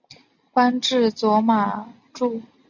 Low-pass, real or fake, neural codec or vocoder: 7.2 kHz; real; none